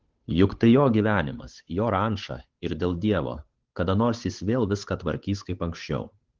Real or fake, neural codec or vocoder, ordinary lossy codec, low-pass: fake; codec, 16 kHz, 8 kbps, FunCodec, trained on Chinese and English, 25 frames a second; Opus, 16 kbps; 7.2 kHz